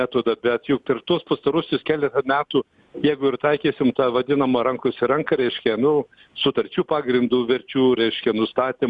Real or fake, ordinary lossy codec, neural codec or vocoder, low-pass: real; Opus, 64 kbps; none; 10.8 kHz